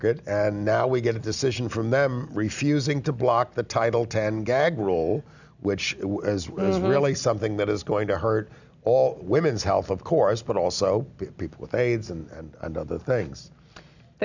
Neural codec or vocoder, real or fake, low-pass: none; real; 7.2 kHz